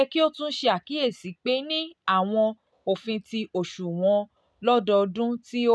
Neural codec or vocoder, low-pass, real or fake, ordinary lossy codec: none; none; real; none